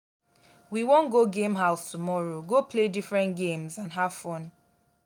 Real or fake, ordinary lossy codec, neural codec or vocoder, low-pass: real; none; none; none